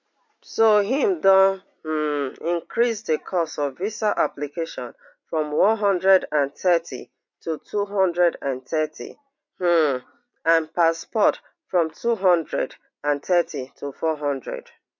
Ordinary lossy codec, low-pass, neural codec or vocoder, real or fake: MP3, 48 kbps; 7.2 kHz; none; real